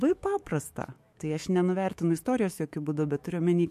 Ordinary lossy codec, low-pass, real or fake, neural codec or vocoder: MP3, 64 kbps; 14.4 kHz; fake; autoencoder, 48 kHz, 128 numbers a frame, DAC-VAE, trained on Japanese speech